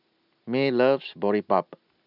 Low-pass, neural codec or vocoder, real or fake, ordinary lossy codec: 5.4 kHz; none; real; none